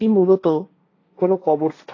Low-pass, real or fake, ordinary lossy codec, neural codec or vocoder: 7.2 kHz; fake; AAC, 32 kbps; codec, 16 kHz, 1.1 kbps, Voila-Tokenizer